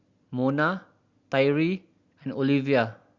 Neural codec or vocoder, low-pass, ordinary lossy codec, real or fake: none; 7.2 kHz; Opus, 64 kbps; real